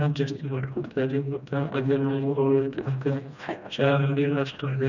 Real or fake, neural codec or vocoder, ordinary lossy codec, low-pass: fake; codec, 16 kHz, 1 kbps, FreqCodec, smaller model; none; 7.2 kHz